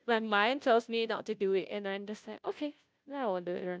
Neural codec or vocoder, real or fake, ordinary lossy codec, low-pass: codec, 16 kHz, 0.5 kbps, FunCodec, trained on Chinese and English, 25 frames a second; fake; none; none